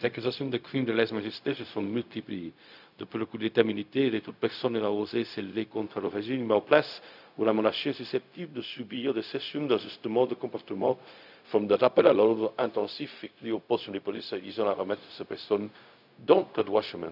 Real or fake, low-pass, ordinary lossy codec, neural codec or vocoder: fake; 5.4 kHz; none; codec, 16 kHz, 0.4 kbps, LongCat-Audio-Codec